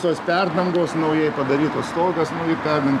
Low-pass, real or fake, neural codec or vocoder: 14.4 kHz; real; none